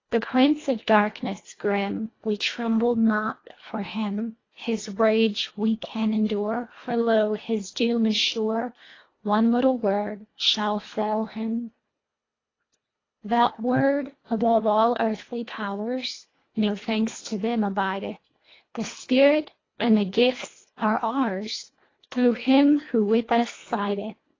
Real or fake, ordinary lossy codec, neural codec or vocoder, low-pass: fake; AAC, 32 kbps; codec, 24 kHz, 1.5 kbps, HILCodec; 7.2 kHz